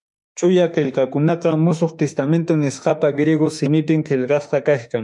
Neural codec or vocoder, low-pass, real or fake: autoencoder, 48 kHz, 32 numbers a frame, DAC-VAE, trained on Japanese speech; 10.8 kHz; fake